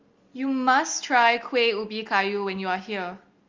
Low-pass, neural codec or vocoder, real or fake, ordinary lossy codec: 7.2 kHz; none; real; Opus, 32 kbps